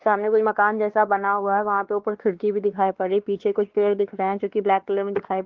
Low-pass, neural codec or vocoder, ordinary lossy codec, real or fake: 7.2 kHz; codec, 16 kHz, 2 kbps, X-Codec, WavLM features, trained on Multilingual LibriSpeech; Opus, 16 kbps; fake